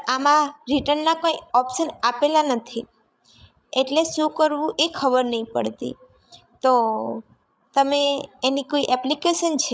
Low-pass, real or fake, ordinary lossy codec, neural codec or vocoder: none; fake; none; codec, 16 kHz, 16 kbps, FreqCodec, larger model